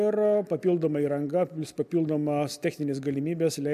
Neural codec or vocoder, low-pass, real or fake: none; 14.4 kHz; real